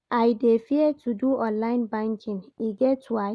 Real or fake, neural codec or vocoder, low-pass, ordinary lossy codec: real; none; none; none